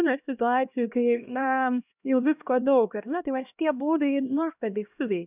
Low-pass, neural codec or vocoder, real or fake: 3.6 kHz; codec, 16 kHz, 1 kbps, X-Codec, HuBERT features, trained on LibriSpeech; fake